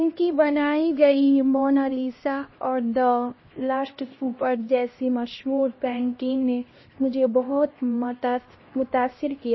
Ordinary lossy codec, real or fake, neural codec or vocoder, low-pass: MP3, 24 kbps; fake; codec, 16 kHz, 1 kbps, X-Codec, HuBERT features, trained on LibriSpeech; 7.2 kHz